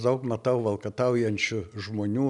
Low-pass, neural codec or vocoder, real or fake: 10.8 kHz; none; real